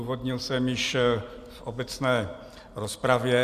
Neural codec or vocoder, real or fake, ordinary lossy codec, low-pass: none; real; Opus, 64 kbps; 14.4 kHz